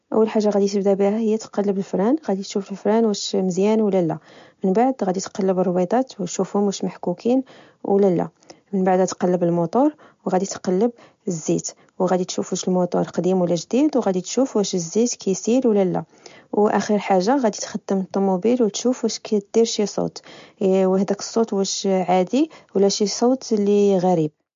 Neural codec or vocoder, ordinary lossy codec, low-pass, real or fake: none; MP3, 48 kbps; 7.2 kHz; real